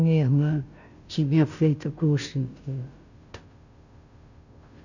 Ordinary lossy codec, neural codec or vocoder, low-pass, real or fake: none; codec, 16 kHz, 0.5 kbps, FunCodec, trained on Chinese and English, 25 frames a second; 7.2 kHz; fake